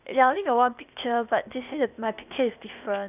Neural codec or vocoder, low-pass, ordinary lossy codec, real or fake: codec, 16 kHz, 0.8 kbps, ZipCodec; 3.6 kHz; none; fake